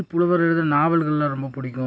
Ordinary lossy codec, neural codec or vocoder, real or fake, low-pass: none; none; real; none